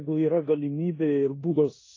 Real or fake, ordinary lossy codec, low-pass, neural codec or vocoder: fake; AAC, 32 kbps; 7.2 kHz; codec, 16 kHz in and 24 kHz out, 0.9 kbps, LongCat-Audio-Codec, four codebook decoder